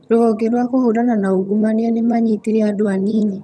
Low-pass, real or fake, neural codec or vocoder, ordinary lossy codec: none; fake; vocoder, 22.05 kHz, 80 mel bands, HiFi-GAN; none